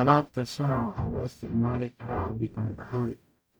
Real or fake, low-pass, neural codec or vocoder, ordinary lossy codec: fake; none; codec, 44.1 kHz, 0.9 kbps, DAC; none